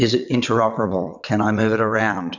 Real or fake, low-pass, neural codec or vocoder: fake; 7.2 kHz; vocoder, 22.05 kHz, 80 mel bands, WaveNeXt